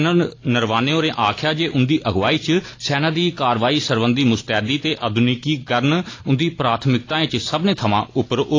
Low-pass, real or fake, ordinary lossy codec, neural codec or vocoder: 7.2 kHz; real; AAC, 32 kbps; none